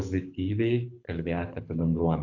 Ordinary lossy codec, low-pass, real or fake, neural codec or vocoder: AAC, 48 kbps; 7.2 kHz; fake; codec, 24 kHz, 6 kbps, HILCodec